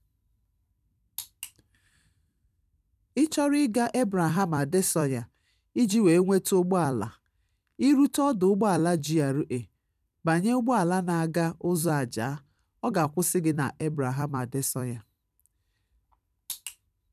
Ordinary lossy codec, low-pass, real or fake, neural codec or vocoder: none; 14.4 kHz; fake; vocoder, 44.1 kHz, 128 mel bands every 256 samples, BigVGAN v2